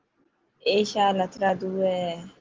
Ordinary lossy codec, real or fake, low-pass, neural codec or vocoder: Opus, 16 kbps; real; 7.2 kHz; none